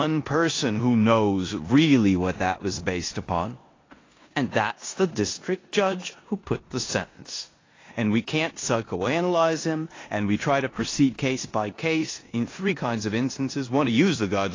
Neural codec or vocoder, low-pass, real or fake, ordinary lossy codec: codec, 16 kHz in and 24 kHz out, 0.9 kbps, LongCat-Audio-Codec, four codebook decoder; 7.2 kHz; fake; AAC, 32 kbps